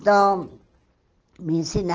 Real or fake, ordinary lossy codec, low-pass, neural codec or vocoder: real; Opus, 16 kbps; 7.2 kHz; none